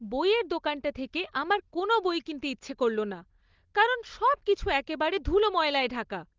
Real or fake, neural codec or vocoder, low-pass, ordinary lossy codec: real; none; 7.2 kHz; Opus, 16 kbps